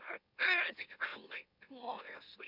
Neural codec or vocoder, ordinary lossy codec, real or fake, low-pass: autoencoder, 44.1 kHz, a latent of 192 numbers a frame, MeloTTS; none; fake; 5.4 kHz